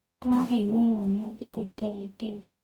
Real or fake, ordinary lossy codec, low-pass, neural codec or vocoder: fake; Opus, 64 kbps; 19.8 kHz; codec, 44.1 kHz, 0.9 kbps, DAC